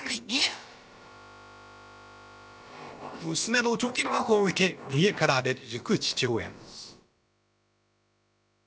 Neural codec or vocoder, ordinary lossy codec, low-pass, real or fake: codec, 16 kHz, about 1 kbps, DyCAST, with the encoder's durations; none; none; fake